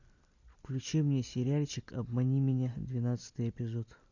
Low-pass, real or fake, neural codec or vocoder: 7.2 kHz; real; none